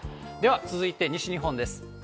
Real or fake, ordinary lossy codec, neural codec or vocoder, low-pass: real; none; none; none